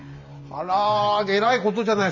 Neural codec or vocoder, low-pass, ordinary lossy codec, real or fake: vocoder, 44.1 kHz, 128 mel bands every 512 samples, BigVGAN v2; 7.2 kHz; none; fake